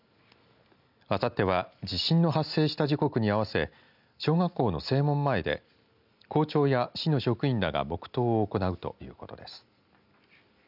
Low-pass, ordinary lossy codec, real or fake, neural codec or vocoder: 5.4 kHz; none; real; none